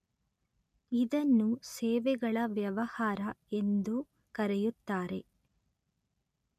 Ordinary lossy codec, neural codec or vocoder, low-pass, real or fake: none; none; 14.4 kHz; real